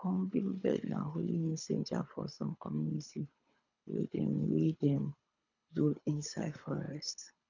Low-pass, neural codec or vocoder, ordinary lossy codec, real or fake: 7.2 kHz; codec, 24 kHz, 3 kbps, HILCodec; none; fake